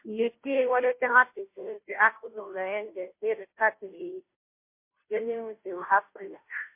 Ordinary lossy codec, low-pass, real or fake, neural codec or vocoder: MP3, 24 kbps; 3.6 kHz; fake; codec, 16 kHz, 0.5 kbps, FunCodec, trained on Chinese and English, 25 frames a second